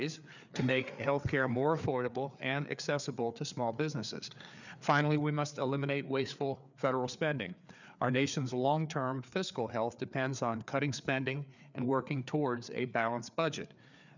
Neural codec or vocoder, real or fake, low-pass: codec, 16 kHz, 4 kbps, FreqCodec, larger model; fake; 7.2 kHz